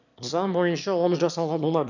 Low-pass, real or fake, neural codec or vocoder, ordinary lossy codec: 7.2 kHz; fake; autoencoder, 22.05 kHz, a latent of 192 numbers a frame, VITS, trained on one speaker; none